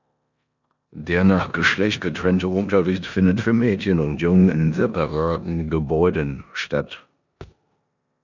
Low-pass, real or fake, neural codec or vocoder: 7.2 kHz; fake; codec, 16 kHz in and 24 kHz out, 0.9 kbps, LongCat-Audio-Codec, four codebook decoder